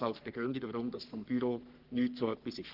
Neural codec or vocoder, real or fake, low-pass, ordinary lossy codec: codec, 44.1 kHz, 3.4 kbps, Pupu-Codec; fake; 5.4 kHz; Opus, 16 kbps